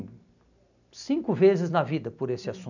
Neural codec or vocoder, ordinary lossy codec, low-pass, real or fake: none; none; 7.2 kHz; real